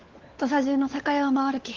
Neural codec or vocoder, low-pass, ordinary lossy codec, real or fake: codec, 16 kHz, 4 kbps, FunCodec, trained on LibriTTS, 50 frames a second; 7.2 kHz; Opus, 16 kbps; fake